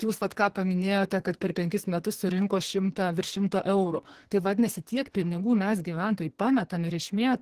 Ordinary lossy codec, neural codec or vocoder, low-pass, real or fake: Opus, 16 kbps; codec, 44.1 kHz, 2.6 kbps, SNAC; 14.4 kHz; fake